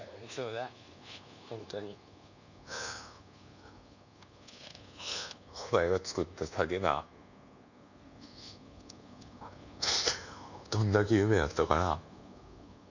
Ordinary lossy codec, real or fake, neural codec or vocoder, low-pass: none; fake; codec, 24 kHz, 1.2 kbps, DualCodec; 7.2 kHz